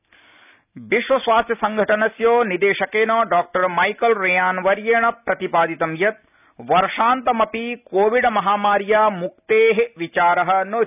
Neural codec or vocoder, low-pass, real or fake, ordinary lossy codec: none; 3.6 kHz; real; none